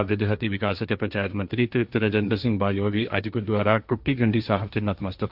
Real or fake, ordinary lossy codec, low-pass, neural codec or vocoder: fake; none; 5.4 kHz; codec, 16 kHz, 1.1 kbps, Voila-Tokenizer